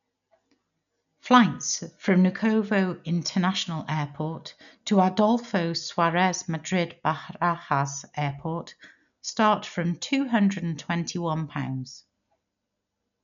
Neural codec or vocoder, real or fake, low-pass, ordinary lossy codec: none; real; 7.2 kHz; none